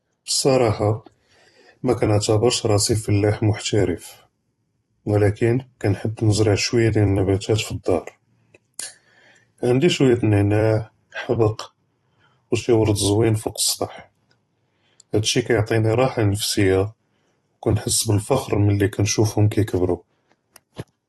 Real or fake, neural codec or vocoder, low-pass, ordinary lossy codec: fake; vocoder, 44.1 kHz, 128 mel bands every 256 samples, BigVGAN v2; 19.8 kHz; AAC, 32 kbps